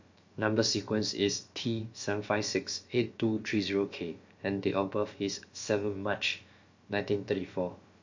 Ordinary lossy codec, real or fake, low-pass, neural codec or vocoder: MP3, 48 kbps; fake; 7.2 kHz; codec, 16 kHz, about 1 kbps, DyCAST, with the encoder's durations